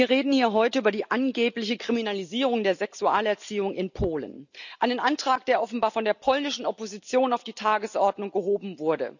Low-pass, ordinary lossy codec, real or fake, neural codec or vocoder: 7.2 kHz; none; real; none